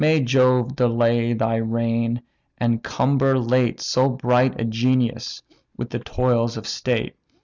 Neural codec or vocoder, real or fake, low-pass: none; real; 7.2 kHz